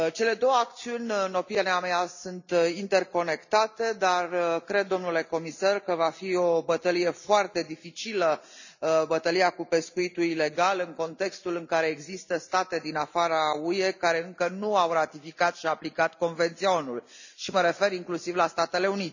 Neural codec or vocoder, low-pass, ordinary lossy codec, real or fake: none; 7.2 kHz; none; real